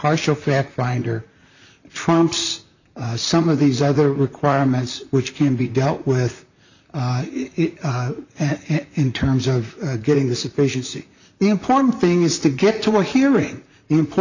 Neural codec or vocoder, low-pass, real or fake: vocoder, 44.1 kHz, 128 mel bands, Pupu-Vocoder; 7.2 kHz; fake